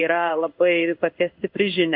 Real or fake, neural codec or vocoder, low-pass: fake; codec, 16 kHz in and 24 kHz out, 1 kbps, XY-Tokenizer; 5.4 kHz